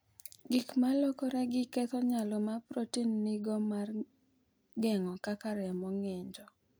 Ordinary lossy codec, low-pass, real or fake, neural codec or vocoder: none; none; real; none